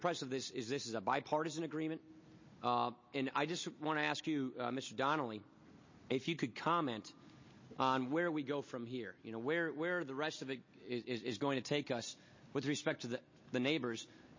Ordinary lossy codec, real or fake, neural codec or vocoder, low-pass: MP3, 32 kbps; real; none; 7.2 kHz